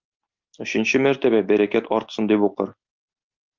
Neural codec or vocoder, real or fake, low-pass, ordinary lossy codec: none; real; 7.2 kHz; Opus, 24 kbps